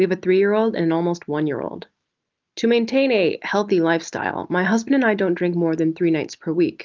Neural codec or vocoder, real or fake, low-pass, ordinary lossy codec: none; real; 7.2 kHz; Opus, 32 kbps